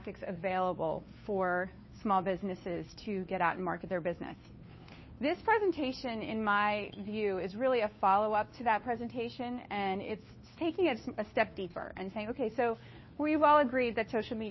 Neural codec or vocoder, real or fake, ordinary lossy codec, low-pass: codec, 16 kHz, 2 kbps, FunCodec, trained on Chinese and English, 25 frames a second; fake; MP3, 24 kbps; 7.2 kHz